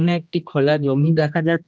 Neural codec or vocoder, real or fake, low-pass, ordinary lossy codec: codec, 16 kHz, 1 kbps, X-Codec, HuBERT features, trained on general audio; fake; none; none